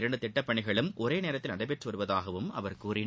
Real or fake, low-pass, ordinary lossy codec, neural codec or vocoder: real; none; none; none